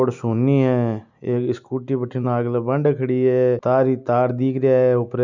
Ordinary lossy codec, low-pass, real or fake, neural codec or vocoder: none; 7.2 kHz; real; none